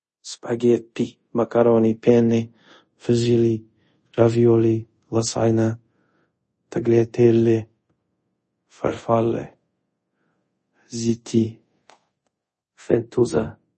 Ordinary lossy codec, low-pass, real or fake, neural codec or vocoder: MP3, 32 kbps; 10.8 kHz; fake; codec, 24 kHz, 0.5 kbps, DualCodec